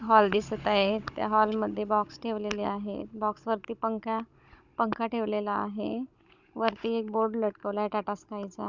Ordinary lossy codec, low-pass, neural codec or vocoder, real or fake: Opus, 64 kbps; 7.2 kHz; codec, 16 kHz, 16 kbps, FunCodec, trained on Chinese and English, 50 frames a second; fake